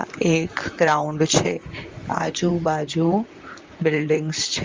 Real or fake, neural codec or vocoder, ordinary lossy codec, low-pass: fake; vocoder, 44.1 kHz, 128 mel bands, Pupu-Vocoder; Opus, 32 kbps; 7.2 kHz